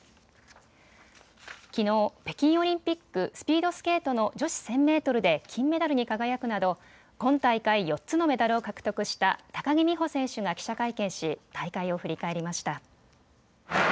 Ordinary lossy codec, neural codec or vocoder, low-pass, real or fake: none; none; none; real